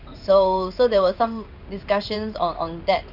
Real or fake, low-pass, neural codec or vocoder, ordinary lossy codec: fake; 5.4 kHz; codec, 16 kHz in and 24 kHz out, 1 kbps, XY-Tokenizer; none